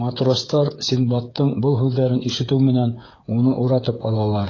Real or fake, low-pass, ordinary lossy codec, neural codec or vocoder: fake; 7.2 kHz; AAC, 32 kbps; codec, 16 kHz, 8 kbps, FreqCodec, smaller model